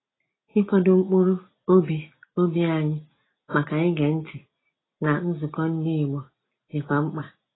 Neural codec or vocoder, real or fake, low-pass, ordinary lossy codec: none; real; 7.2 kHz; AAC, 16 kbps